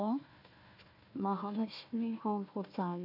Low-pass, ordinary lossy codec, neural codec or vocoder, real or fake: 5.4 kHz; none; codec, 16 kHz, 1 kbps, FunCodec, trained on Chinese and English, 50 frames a second; fake